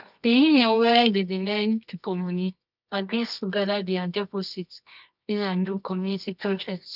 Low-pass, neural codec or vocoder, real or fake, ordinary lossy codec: 5.4 kHz; codec, 24 kHz, 0.9 kbps, WavTokenizer, medium music audio release; fake; none